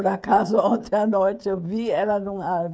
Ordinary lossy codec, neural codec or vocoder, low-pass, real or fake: none; codec, 16 kHz, 16 kbps, FreqCodec, smaller model; none; fake